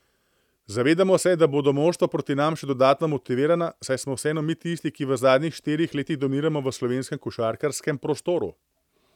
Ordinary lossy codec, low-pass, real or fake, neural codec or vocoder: none; 19.8 kHz; real; none